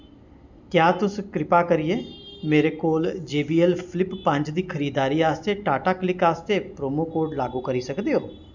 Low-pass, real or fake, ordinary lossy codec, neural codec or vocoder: 7.2 kHz; real; none; none